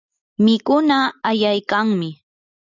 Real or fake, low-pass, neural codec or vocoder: real; 7.2 kHz; none